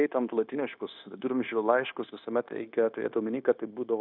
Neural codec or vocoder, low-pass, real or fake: codec, 16 kHz, 0.9 kbps, LongCat-Audio-Codec; 5.4 kHz; fake